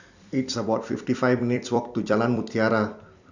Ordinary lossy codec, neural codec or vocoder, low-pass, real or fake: none; vocoder, 44.1 kHz, 128 mel bands every 256 samples, BigVGAN v2; 7.2 kHz; fake